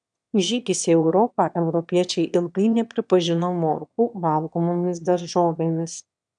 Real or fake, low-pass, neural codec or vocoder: fake; 9.9 kHz; autoencoder, 22.05 kHz, a latent of 192 numbers a frame, VITS, trained on one speaker